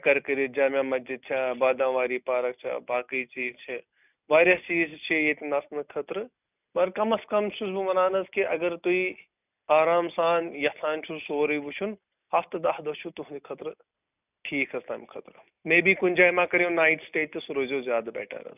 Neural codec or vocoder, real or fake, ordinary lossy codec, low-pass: none; real; none; 3.6 kHz